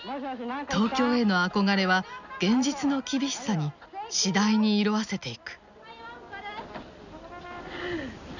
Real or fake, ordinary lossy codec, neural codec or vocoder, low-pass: real; none; none; 7.2 kHz